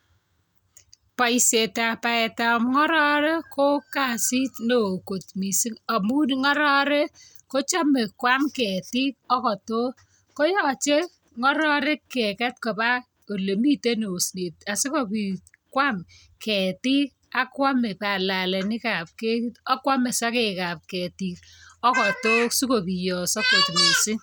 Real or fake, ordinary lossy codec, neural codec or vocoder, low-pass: real; none; none; none